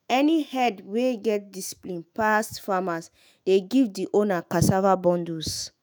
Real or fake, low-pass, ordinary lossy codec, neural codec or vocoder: fake; none; none; autoencoder, 48 kHz, 128 numbers a frame, DAC-VAE, trained on Japanese speech